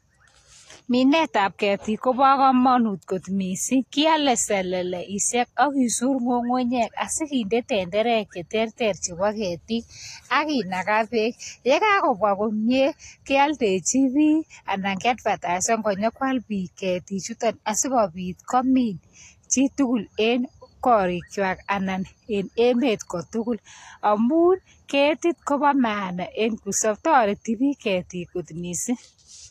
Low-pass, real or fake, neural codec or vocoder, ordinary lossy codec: 14.4 kHz; real; none; AAC, 48 kbps